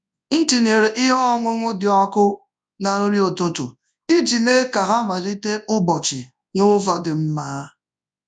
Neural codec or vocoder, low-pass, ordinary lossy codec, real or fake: codec, 24 kHz, 0.9 kbps, WavTokenizer, large speech release; 9.9 kHz; none; fake